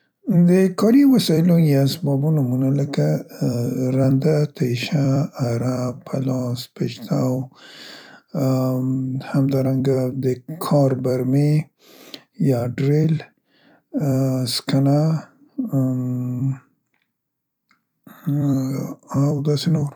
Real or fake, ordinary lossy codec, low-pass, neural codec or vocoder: fake; none; 19.8 kHz; vocoder, 44.1 kHz, 128 mel bands every 256 samples, BigVGAN v2